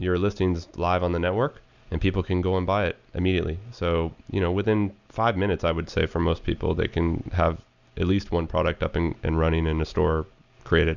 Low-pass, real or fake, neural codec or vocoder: 7.2 kHz; real; none